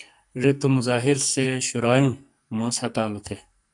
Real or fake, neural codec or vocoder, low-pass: fake; codec, 44.1 kHz, 2.6 kbps, SNAC; 10.8 kHz